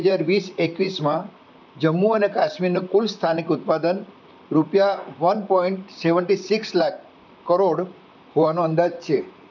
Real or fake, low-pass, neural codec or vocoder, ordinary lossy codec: fake; 7.2 kHz; vocoder, 44.1 kHz, 128 mel bands, Pupu-Vocoder; none